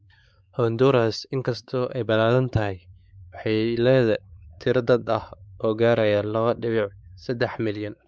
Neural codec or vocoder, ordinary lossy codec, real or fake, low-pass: codec, 16 kHz, 4 kbps, X-Codec, WavLM features, trained on Multilingual LibriSpeech; none; fake; none